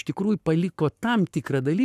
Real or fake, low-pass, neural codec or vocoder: fake; 14.4 kHz; codec, 44.1 kHz, 7.8 kbps, Pupu-Codec